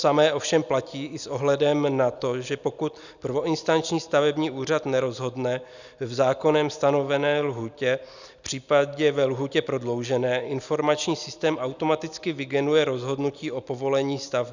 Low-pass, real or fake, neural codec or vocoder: 7.2 kHz; real; none